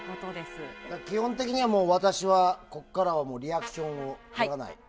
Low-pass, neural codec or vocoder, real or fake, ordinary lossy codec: none; none; real; none